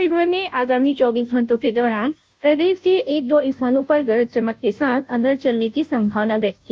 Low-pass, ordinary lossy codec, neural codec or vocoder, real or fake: none; none; codec, 16 kHz, 0.5 kbps, FunCodec, trained on Chinese and English, 25 frames a second; fake